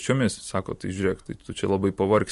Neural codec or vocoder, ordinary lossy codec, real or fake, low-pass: none; MP3, 48 kbps; real; 14.4 kHz